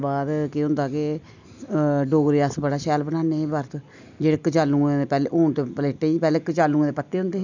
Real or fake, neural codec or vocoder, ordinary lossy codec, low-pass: real; none; none; 7.2 kHz